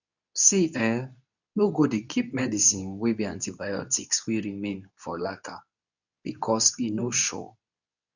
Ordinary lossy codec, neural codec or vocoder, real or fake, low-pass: none; codec, 24 kHz, 0.9 kbps, WavTokenizer, medium speech release version 2; fake; 7.2 kHz